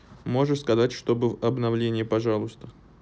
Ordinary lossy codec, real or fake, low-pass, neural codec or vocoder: none; real; none; none